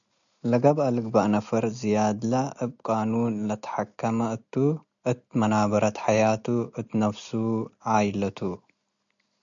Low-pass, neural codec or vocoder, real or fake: 7.2 kHz; none; real